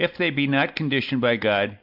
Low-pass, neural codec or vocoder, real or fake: 5.4 kHz; none; real